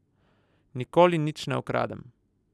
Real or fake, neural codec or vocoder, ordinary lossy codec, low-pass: real; none; none; none